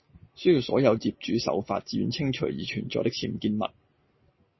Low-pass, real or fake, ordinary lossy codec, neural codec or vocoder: 7.2 kHz; real; MP3, 24 kbps; none